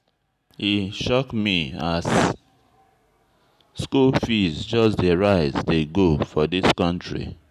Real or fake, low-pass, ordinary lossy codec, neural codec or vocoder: real; 14.4 kHz; none; none